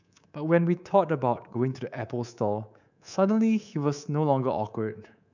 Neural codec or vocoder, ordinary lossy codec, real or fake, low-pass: codec, 24 kHz, 3.1 kbps, DualCodec; none; fake; 7.2 kHz